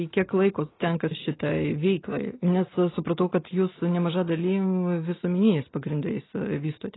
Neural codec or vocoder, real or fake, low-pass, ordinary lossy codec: none; real; 7.2 kHz; AAC, 16 kbps